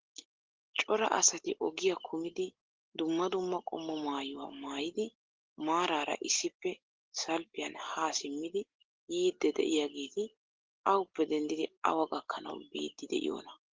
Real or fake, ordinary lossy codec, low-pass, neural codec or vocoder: real; Opus, 16 kbps; 7.2 kHz; none